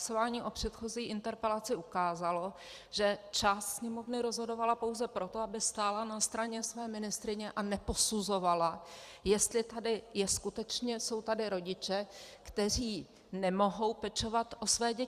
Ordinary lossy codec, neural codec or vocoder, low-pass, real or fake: Opus, 64 kbps; vocoder, 44.1 kHz, 128 mel bands every 256 samples, BigVGAN v2; 14.4 kHz; fake